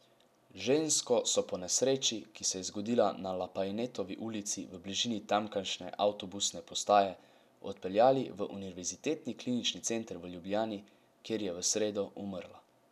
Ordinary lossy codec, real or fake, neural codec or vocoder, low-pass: none; real; none; 14.4 kHz